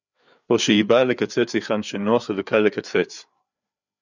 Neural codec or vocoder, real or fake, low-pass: codec, 16 kHz, 4 kbps, FreqCodec, larger model; fake; 7.2 kHz